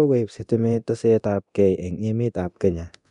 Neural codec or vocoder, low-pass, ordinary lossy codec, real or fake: codec, 24 kHz, 0.9 kbps, DualCodec; 10.8 kHz; none; fake